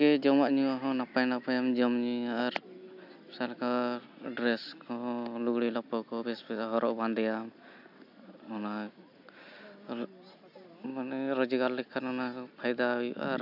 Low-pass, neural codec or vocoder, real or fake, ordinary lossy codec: 5.4 kHz; none; real; AAC, 48 kbps